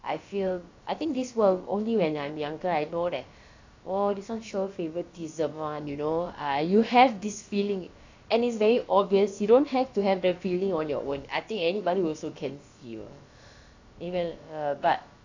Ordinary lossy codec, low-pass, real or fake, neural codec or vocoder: AAC, 48 kbps; 7.2 kHz; fake; codec, 16 kHz, about 1 kbps, DyCAST, with the encoder's durations